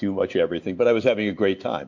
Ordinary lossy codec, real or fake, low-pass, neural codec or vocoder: MP3, 48 kbps; real; 7.2 kHz; none